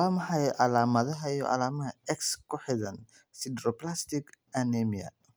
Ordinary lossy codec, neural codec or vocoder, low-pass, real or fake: none; none; none; real